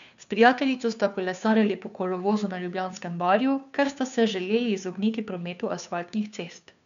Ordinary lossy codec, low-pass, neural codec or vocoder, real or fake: none; 7.2 kHz; codec, 16 kHz, 2 kbps, FunCodec, trained on Chinese and English, 25 frames a second; fake